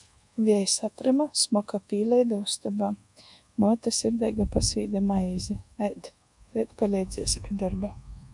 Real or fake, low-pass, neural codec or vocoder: fake; 10.8 kHz; codec, 24 kHz, 1.2 kbps, DualCodec